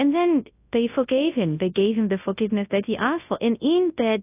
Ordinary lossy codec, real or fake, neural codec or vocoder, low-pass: AAC, 24 kbps; fake; codec, 24 kHz, 0.9 kbps, WavTokenizer, large speech release; 3.6 kHz